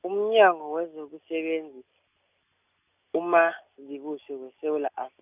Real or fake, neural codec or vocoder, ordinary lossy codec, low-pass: real; none; none; 3.6 kHz